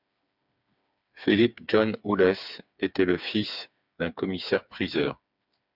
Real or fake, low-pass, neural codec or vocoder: fake; 5.4 kHz; codec, 16 kHz, 4 kbps, FreqCodec, smaller model